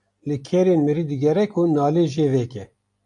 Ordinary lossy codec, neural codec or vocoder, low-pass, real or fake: AAC, 64 kbps; none; 10.8 kHz; real